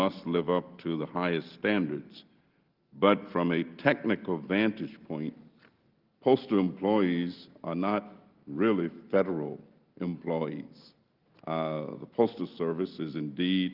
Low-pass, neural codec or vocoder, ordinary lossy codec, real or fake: 5.4 kHz; none; Opus, 24 kbps; real